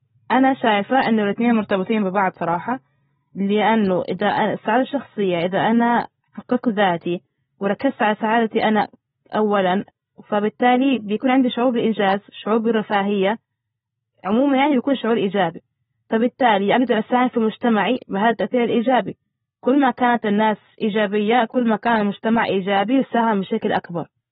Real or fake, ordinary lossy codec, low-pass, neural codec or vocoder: real; AAC, 16 kbps; 19.8 kHz; none